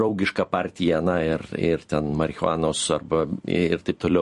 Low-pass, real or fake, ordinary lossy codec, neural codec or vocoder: 14.4 kHz; fake; MP3, 48 kbps; vocoder, 44.1 kHz, 128 mel bands every 256 samples, BigVGAN v2